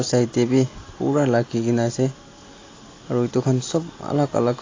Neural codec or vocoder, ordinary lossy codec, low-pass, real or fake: none; AAC, 32 kbps; 7.2 kHz; real